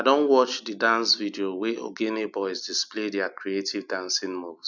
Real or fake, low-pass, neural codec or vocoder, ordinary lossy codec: real; 7.2 kHz; none; none